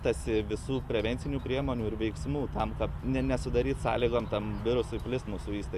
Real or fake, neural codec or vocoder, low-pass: real; none; 14.4 kHz